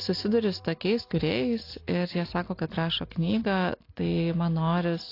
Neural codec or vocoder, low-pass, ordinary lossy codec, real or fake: none; 5.4 kHz; AAC, 32 kbps; real